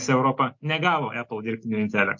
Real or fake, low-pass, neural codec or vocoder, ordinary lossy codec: real; 7.2 kHz; none; MP3, 48 kbps